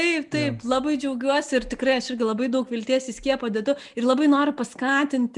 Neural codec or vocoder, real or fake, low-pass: none; real; 10.8 kHz